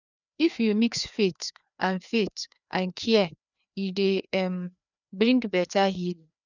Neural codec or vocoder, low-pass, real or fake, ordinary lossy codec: codec, 16 kHz, 2 kbps, FreqCodec, larger model; 7.2 kHz; fake; none